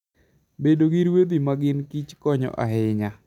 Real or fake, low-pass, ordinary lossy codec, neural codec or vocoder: real; 19.8 kHz; none; none